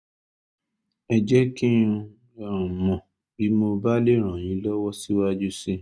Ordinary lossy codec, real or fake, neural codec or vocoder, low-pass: none; real; none; none